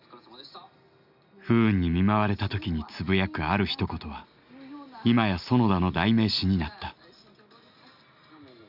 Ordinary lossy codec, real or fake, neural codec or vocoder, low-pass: none; real; none; 5.4 kHz